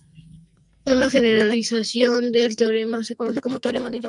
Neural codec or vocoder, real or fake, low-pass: codec, 44.1 kHz, 2.6 kbps, SNAC; fake; 10.8 kHz